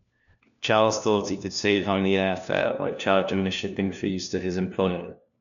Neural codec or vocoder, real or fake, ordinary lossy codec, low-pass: codec, 16 kHz, 1 kbps, FunCodec, trained on LibriTTS, 50 frames a second; fake; none; 7.2 kHz